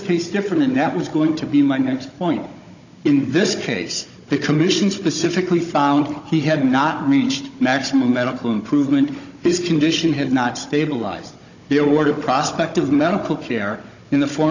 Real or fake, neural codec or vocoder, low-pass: fake; codec, 16 kHz, 16 kbps, FunCodec, trained on Chinese and English, 50 frames a second; 7.2 kHz